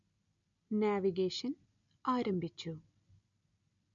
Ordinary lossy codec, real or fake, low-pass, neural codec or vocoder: none; real; 7.2 kHz; none